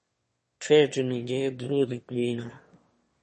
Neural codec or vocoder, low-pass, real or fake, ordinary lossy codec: autoencoder, 22.05 kHz, a latent of 192 numbers a frame, VITS, trained on one speaker; 9.9 kHz; fake; MP3, 32 kbps